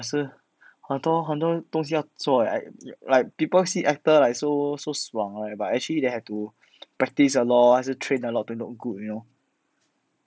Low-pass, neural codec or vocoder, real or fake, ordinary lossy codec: none; none; real; none